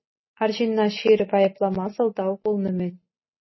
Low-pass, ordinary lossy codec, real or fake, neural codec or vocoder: 7.2 kHz; MP3, 24 kbps; real; none